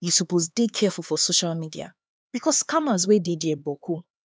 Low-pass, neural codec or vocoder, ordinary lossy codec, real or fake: none; codec, 16 kHz, 4 kbps, X-Codec, HuBERT features, trained on LibriSpeech; none; fake